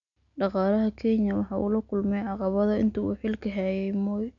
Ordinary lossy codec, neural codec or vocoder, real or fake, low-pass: none; none; real; 7.2 kHz